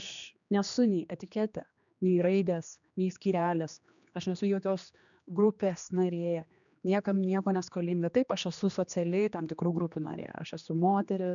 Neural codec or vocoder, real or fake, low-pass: codec, 16 kHz, 2 kbps, X-Codec, HuBERT features, trained on general audio; fake; 7.2 kHz